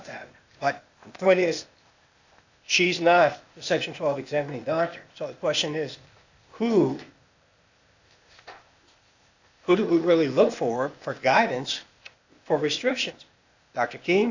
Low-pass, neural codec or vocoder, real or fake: 7.2 kHz; codec, 16 kHz, 0.8 kbps, ZipCodec; fake